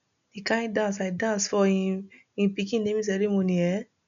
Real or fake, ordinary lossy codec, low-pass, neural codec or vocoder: real; none; 7.2 kHz; none